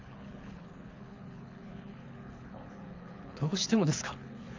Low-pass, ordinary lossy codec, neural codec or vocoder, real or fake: 7.2 kHz; MP3, 64 kbps; codec, 24 kHz, 6 kbps, HILCodec; fake